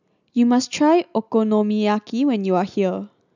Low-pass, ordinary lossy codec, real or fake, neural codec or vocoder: 7.2 kHz; none; real; none